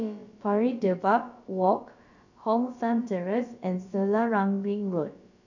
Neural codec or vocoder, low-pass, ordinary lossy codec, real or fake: codec, 16 kHz, about 1 kbps, DyCAST, with the encoder's durations; 7.2 kHz; none; fake